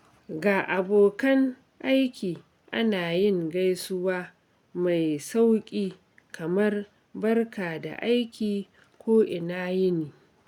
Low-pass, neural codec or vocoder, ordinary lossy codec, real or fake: 19.8 kHz; none; none; real